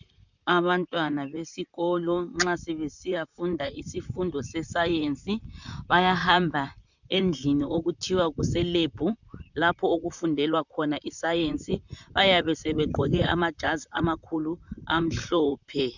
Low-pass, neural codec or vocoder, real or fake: 7.2 kHz; vocoder, 44.1 kHz, 128 mel bands, Pupu-Vocoder; fake